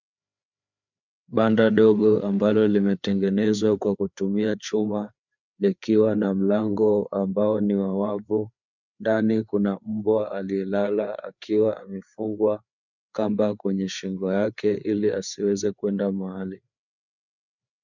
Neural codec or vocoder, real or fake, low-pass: codec, 16 kHz, 4 kbps, FreqCodec, larger model; fake; 7.2 kHz